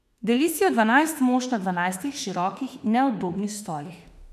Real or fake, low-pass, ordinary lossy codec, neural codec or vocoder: fake; 14.4 kHz; none; autoencoder, 48 kHz, 32 numbers a frame, DAC-VAE, trained on Japanese speech